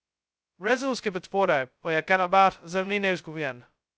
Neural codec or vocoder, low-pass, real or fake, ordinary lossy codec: codec, 16 kHz, 0.2 kbps, FocalCodec; none; fake; none